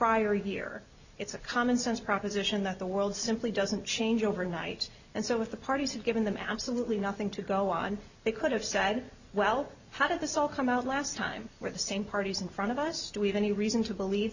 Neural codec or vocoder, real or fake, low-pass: none; real; 7.2 kHz